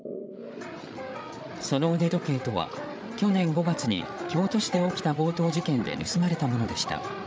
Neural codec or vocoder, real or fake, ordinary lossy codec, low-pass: codec, 16 kHz, 8 kbps, FreqCodec, larger model; fake; none; none